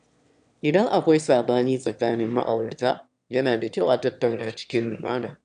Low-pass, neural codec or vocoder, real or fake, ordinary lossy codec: 9.9 kHz; autoencoder, 22.05 kHz, a latent of 192 numbers a frame, VITS, trained on one speaker; fake; none